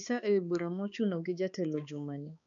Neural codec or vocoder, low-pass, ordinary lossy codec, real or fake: codec, 16 kHz, 2 kbps, X-Codec, HuBERT features, trained on balanced general audio; 7.2 kHz; none; fake